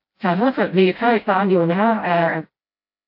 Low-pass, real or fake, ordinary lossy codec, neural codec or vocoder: 5.4 kHz; fake; AAC, 32 kbps; codec, 16 kHz, 0.5 kbps, FreqCodec, smaller model